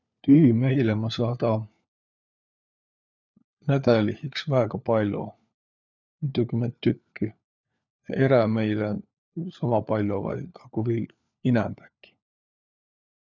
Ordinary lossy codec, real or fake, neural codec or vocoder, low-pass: none; fake; codec, 16 kHz, 4 kbps, FunCodec, trained on LibriTTS, 50 frames a second; 7.2 kHz